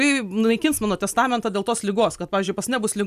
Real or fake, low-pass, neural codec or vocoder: real; 14.4 kHz; none